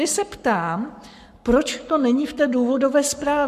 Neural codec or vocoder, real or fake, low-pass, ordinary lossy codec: codec, 44.1 kHz, 7.8 kbps, Pupu-Codec; fake; 14.4 kHz; MP3, 96 kbps